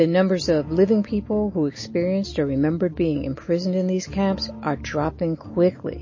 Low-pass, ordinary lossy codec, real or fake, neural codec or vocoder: 7.2 kHz; MP3, 32 kbps; real; none